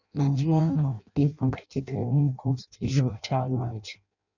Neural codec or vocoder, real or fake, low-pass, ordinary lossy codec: codec, 16 kHz in and 24 kHz out, 0.6 kbps, FireRedTTS-2 codec; fake; 7.2 kHz; none